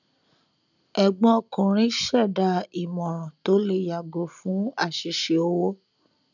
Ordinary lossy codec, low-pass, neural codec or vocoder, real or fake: none; 7.2 kHz; none; real